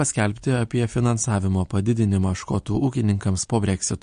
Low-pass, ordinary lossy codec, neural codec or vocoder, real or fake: 9.9 kHz; MP3, 48 kbps; none; real